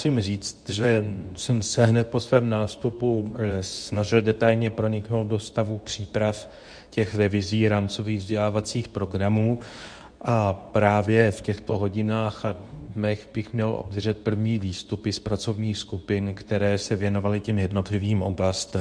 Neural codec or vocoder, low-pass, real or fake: codec, 24 kHz, 0.9 kbps, WavTokenizer, medium speech release version 2; 9.9 kHz; fake